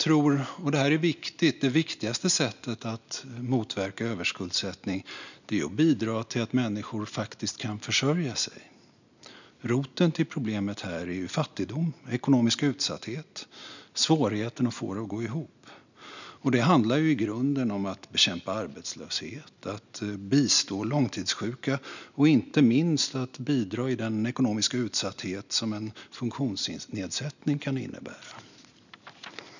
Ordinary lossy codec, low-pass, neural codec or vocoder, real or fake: none; 7.2 kHz; none; real